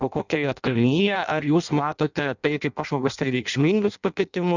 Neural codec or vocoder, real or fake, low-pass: codec, 16 kHz in and 24 kHz out, 0.6 kbps, FireRedTTS-2 codec; fake; 7.2 kHz